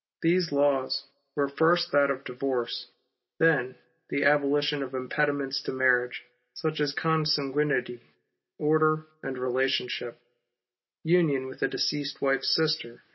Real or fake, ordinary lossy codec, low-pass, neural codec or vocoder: real; MP3, 24 kbps; 7.2 kHz; none